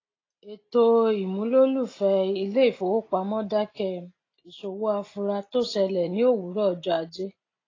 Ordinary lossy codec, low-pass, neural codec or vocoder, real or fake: AAC, 32 kbps; 7.2 kHz; none; real